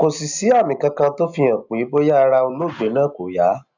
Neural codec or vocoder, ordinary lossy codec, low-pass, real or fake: none; none; 7.2 kHz; real